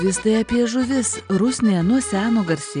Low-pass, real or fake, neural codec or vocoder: 9.9 kHz; real; none